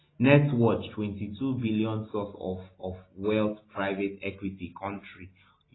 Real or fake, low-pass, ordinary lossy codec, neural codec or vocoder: real; 7.2 kHz; AAC, 16 kbps; none